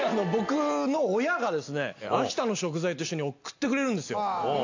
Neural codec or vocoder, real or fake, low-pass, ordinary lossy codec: none; real; 7.2 kHz; AAC, 48 kbps